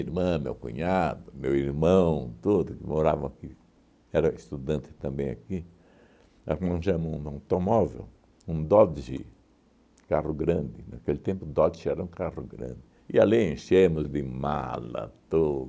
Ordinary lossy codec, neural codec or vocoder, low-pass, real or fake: none; none; none; real